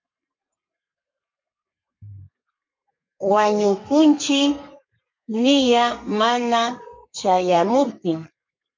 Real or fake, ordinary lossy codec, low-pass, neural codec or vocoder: fake; MP3, 64 kbps; 7.2 kHz; codec, 32 kHz, 1.9 kbps, SNAC